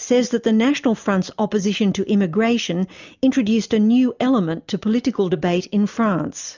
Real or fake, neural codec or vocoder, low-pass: real; none; 7.2 kHz